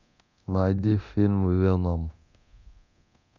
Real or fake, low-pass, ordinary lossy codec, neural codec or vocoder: fake; 7.2 kHz; none; codec, 24 kHz, 0.9 kbps, DualCodec